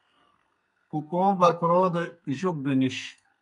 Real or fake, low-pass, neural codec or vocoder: fake; 10.8 kHz; codec, 32 kHz, 1.9 kbps, SNAC